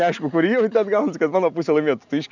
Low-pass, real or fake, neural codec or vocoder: 7.2 kHz; real; none